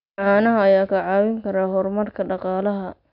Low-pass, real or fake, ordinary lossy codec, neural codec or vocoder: 5.4 kHz; real; none; none